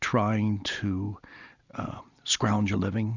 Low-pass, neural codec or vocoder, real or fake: 7.2 kHz; none; real